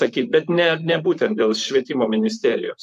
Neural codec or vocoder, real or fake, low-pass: vocoder, 44.1 kHz, 128 mel bands, Pupu-Vocoder; fake; 14.4 kHz